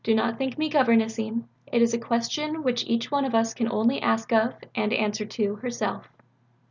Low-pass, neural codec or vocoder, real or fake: 7.2 kHz; none; real